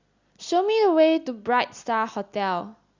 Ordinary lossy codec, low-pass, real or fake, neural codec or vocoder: Opus, 64 kbps; 7.2 kHz; real; none